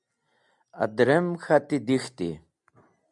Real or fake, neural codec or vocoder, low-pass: real; none; 10.8 kHz